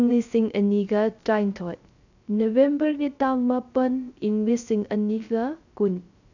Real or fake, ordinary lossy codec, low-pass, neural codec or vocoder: fake; none; 7.2 kHz; codec, 16 kHz, 0.3 kbps, FocalCodec